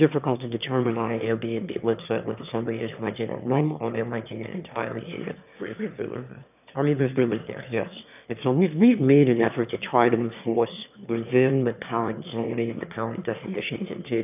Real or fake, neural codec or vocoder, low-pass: fake; autoencoder, 22.05 kHz, a latent of 192 numbers a frame, VITS, trained on one speaker; 3.6 kHz